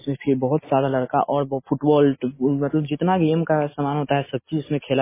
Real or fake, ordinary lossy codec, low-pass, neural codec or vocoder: real; MP3, 16 kbps; 3.6 kHz; none